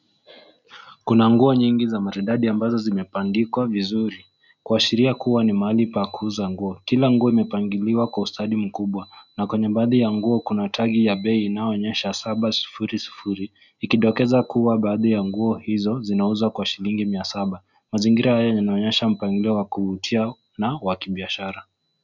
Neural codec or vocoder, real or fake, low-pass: none; real; 7.2 kHz